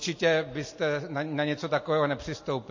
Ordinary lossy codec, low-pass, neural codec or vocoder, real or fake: MP3, 32 kbps; 7.2 kHz; none; real